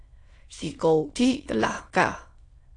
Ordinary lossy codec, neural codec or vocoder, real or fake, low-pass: AAC, 48 kbps; autoencoder, 22.05 kHz, a latent of 192 numbers a frame, VITS, trained on many speakers; fake; 9.9 kHz